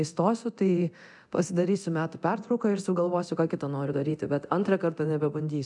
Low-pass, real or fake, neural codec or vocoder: 10.8 kHz; fake; codec, 24 kHz, 0.9 kbps, DualCodec